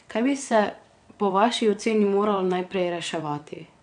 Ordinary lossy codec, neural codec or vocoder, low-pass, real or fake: none; vocoder, 22.05 kHz, 80 mel bands, WaveNeXt; 9.9 kHz; fake